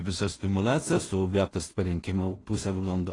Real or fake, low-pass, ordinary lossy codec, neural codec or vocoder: fake; 10.8 kHz; AAC, 32 kbps; codec, 16 kHz in and 24 kHz out, 0.4 kbps, LongCat-Audio-Codec, two codebook decoder